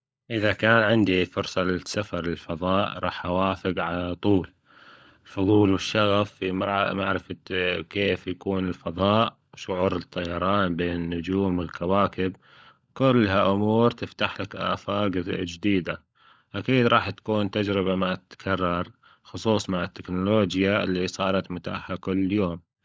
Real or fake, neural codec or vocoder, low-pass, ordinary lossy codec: fake; codec, 16 kHz, 16 kbps, FunCodec, trained on LibriTTS, 50 frames a second; none; none